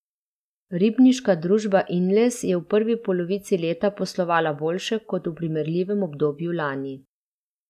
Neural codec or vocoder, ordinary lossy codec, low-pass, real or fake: none; none; 14.4 kHz; real